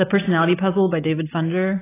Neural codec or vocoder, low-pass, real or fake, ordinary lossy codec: none; 3.6 kHz; real; AAC, 16 kbps